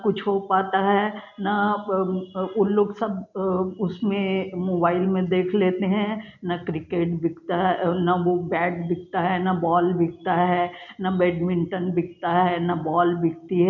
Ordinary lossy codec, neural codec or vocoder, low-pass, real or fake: none; none; 7.2 kHz; real